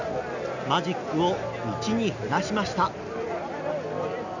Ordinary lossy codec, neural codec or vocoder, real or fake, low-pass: none; none; real; 7.2 kHz